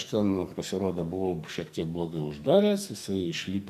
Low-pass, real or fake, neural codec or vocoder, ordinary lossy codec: 14.4 kHz; fake; codec, 44.1 kHz, 2.6 kbps, SNAC; AAC, 96 kbps